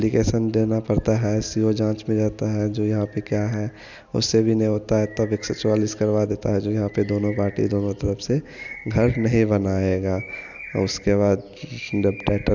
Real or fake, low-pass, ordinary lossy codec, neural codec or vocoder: real; 7.2 kHz; none; none